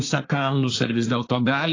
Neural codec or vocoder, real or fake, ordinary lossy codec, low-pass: codec, 24 kHz, 1 kbps, SNAC; fake; AAC, 32 kbps; 7.2 kHz